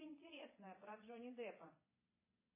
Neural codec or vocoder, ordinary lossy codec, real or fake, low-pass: vocoder, 22.05 kHz, 80 mel bands, Vocos; MP3, 16 kbps; fake; 3.6 kHz